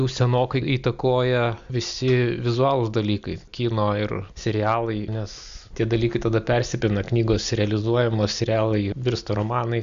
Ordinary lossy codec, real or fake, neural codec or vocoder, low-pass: Opus, 64 kbps; real; none; 7.2 kHz